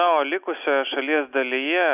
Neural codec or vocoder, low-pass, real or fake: none; 3.6 kHz; real